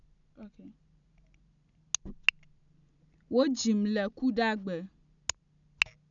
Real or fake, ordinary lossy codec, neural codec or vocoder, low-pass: real; none; none; 7.2 kHz